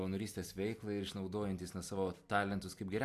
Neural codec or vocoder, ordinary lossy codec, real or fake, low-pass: none; AAC, 64 kbps; real; 14.4 kHz